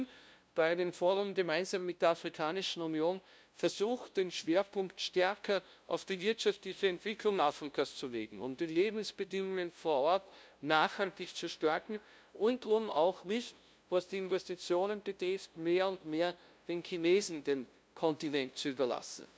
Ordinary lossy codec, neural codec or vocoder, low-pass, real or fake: none; codec, 16 kHz, 0.5 kbps, FunCodec, trained on LibriTTS, 25 frames a second; none; fake